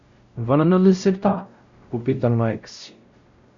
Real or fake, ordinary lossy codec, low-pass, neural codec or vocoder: fake; Opus, 64 kbps; 7.2 kHz; codec, 16 kHz, 0.5 kbps, X-Codec, WavLM features, trained on Multilingual LibriSpeech